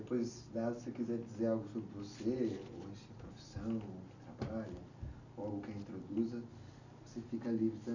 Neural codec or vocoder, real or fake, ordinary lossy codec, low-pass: none; real; none; 7.2 kHz